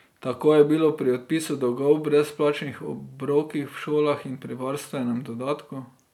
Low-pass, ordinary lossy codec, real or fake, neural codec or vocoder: 19.8 kHz; none; real; none